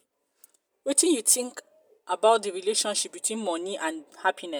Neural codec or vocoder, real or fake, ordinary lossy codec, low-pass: none; real; none; none